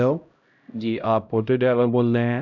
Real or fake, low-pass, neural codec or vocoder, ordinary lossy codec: fake; 7.2 kHz; codec, 16 kHz, 0.5 kbps, X-Codec, HuBERT features, trained on LibriSpeech; none